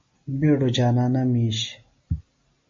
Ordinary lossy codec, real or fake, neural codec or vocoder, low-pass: MP3, 32 kbps; real; none; 7.2 kHz